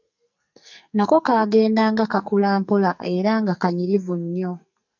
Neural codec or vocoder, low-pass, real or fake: codec, 44.1 kHz, 2.6 kbps, SNAC; 7.2 kHz; fake